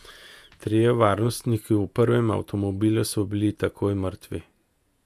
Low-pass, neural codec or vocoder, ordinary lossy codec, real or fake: 14.4 kHz; vocoder, 48 kHz, 128 mel bands, Vocos; none; fake